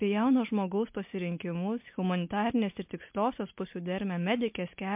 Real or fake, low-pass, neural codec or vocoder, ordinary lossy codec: real; 3.6 kHz; none; MP3, 32 kbps